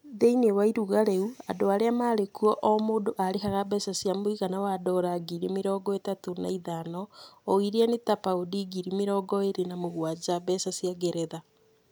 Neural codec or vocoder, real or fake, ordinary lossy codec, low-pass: none; real; none; none